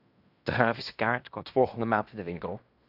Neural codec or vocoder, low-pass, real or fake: codec, 16 kHz in and 24 kHz out, 0.9 kbps, LongCat-Audio-Codec, fine tuned four codebook decoder; 5.4 kHz; fake